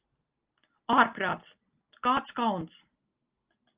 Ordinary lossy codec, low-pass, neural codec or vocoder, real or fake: Opus, 24 kbps; 3.6 kHz; none; real